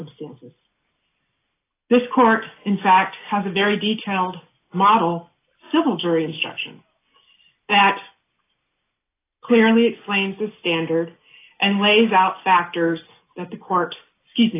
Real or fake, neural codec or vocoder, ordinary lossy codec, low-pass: real; none; AAC, 24 kbps; 3.6 kHz